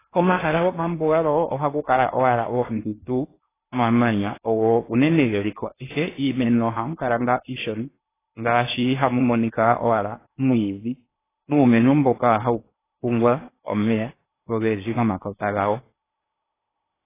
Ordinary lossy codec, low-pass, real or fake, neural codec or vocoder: AAC, 16 kbps; 3.6 kHz; fake; codec, 16 kHz in and 24 kHz out, 0.8 kbps, FocalCodec, streaming, 65536 codes